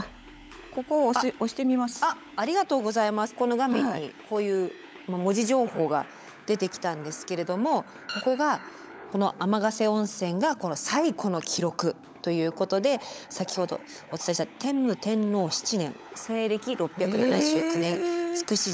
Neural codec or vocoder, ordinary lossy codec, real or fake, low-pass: codec, 16 kHz, 16 kbps, FunCodec, trained on LibriTTS, 50 frames a second; none; fake; none